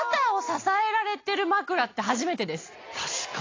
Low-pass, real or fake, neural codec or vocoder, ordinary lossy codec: 7.2 kHz; real; none; AAC, 32 kbps